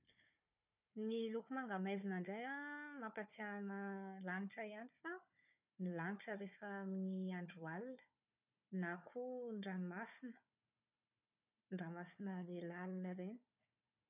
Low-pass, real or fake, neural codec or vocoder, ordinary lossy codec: 3.6 kHz; fake; codec, 16 kHz, 4 kbps, FunCodec, trained on Chinese and English, 50 frames a second; none